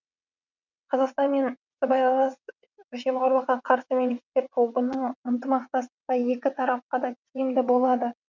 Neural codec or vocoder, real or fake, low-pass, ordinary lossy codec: vocoder, 22.05 kHz, 80 mel bands, WaveNeXt; fake; 7.2 kHz; none